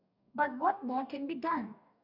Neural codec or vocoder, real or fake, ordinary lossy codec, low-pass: codec, 44.1 kHz, 2.6 kbps, DAC; fake; none; 5.4 kHz